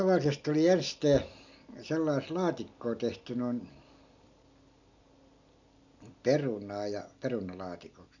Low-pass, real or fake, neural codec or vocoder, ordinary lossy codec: 7.2 kHz; real; none; none